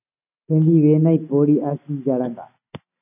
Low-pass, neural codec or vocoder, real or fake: 3.6 kHz; none; real